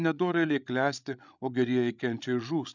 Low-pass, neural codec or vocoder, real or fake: 7.2 kHz; codec, 16 kHz, 16 kbps, FreqCodec, larger model; fake